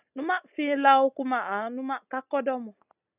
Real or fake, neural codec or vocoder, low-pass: real; none; 3.6 kHz